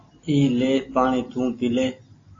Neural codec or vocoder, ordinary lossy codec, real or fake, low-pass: none; AAC, 32 kbps; real; 7.2 kHz